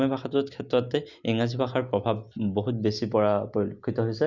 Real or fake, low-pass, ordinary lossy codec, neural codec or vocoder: real; 7.2 kHz; Opus, 64 kbps; none